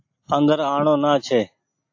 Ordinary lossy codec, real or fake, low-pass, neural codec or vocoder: AAC, 48 kbps; real; 7.2 kHz; none